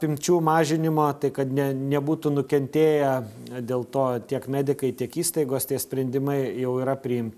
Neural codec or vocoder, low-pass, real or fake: none; 14.4 kHz; real